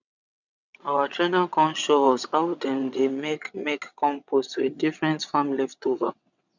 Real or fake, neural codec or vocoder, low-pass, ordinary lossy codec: fake; vocoder, 22.05 kHz, 80 mel bands, WaveNeXt; 7.2 kHz; none